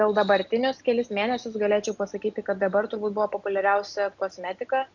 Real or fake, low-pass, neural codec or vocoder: real; 7.2 kHz; none